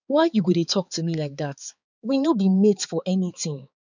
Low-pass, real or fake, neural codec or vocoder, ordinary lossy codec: 7.2 kHz; fake; codec, 16 kHz, 4 kbps, X-Codec, HuBERT features, trained on balanced general audio; none